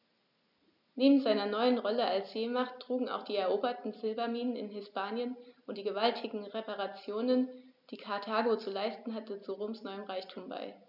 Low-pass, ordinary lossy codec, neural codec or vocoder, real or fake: 5.4 kHz; none; none; real